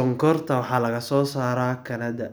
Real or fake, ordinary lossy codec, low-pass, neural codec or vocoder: real; none; none; none